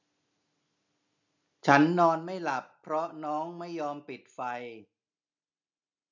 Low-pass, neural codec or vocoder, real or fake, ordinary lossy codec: 7.2 kHz; none; real; none